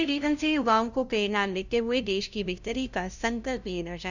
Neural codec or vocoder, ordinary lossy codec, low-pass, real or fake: codec, 16 kHz, 0.5 kbps, FunCodec, trained on LibriTTS, 25 frames a second; none; 7.2 kHz; fake